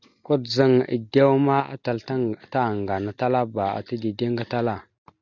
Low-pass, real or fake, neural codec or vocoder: 7.2 kHz; real; none